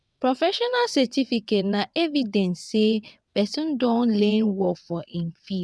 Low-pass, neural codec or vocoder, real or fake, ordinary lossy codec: none; vocoder, 22.05 kHz, 80 mel bands, WaveNeXt; fake; none